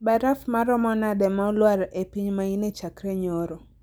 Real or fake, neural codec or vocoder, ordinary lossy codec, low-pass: real; none; none; none